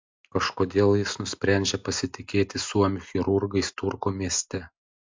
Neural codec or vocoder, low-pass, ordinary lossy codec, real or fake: none; 7.2 kHz; MP3, 64 kbps; real